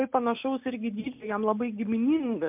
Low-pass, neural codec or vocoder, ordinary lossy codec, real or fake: 3.6 kHz; none; MP3, 32 kbps; real